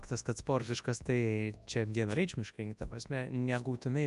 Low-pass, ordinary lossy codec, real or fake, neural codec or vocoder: 10.8 kHz; AAC, 96 kbps; fake; codec, 24 kHz, 0.9 kbps, WavTokenizer, large speech release